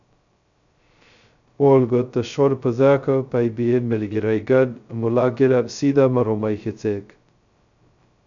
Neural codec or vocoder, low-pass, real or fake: codec, 16 kHz, 0.2 kbps, FocalCodec; 7.2 kHz; fake